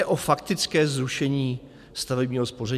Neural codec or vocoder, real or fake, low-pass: none; real; 14.4 kHz